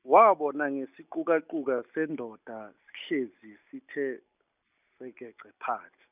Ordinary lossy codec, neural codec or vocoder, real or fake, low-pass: none; none; real; 3.6 kHz